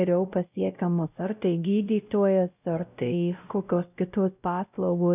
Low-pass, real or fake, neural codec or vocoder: 3.6 kHz; fake; codec, 16 kHz, 0.5 kbps, X-Codec, WavLM features, trained on Multilingual LibriSpeech